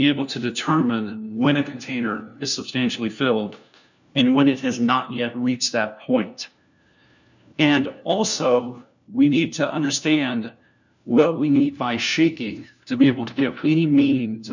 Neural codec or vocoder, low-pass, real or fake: codec, 16 kHz, 1 kbps, FunCodec, trained on LibriTTS, 50 frames a second; 7.2 kHz; fake